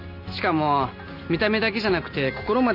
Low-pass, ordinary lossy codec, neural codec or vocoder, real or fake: 5.4 kHz; none; none; real